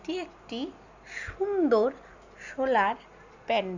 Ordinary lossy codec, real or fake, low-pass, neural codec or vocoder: Opus, 64 kbps; real; 7.2 kHz; none